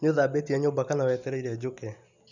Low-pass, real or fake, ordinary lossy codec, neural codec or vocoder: 7.2 kHz; real; none; none